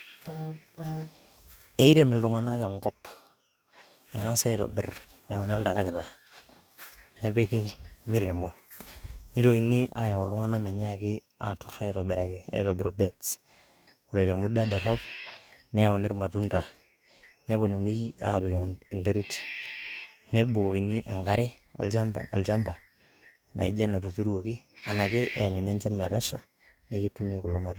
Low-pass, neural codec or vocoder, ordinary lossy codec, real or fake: none; codec, 44.1 kHz, 2.6 kbps, DAC; none; fake